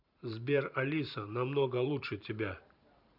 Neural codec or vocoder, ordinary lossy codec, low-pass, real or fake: vocoder, 44.1 kHz, 128 mel bands every 512 samples, BigVGAN v2; none; 5.4 kHz; fake